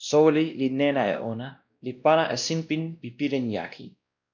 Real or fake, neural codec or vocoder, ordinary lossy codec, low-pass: fake; codec, 16 kHz, 1 kbps, X-Codec, WavLM features, trained on Multilingual LibriSpeech; MP3, 64 kbps; 7.2 kHz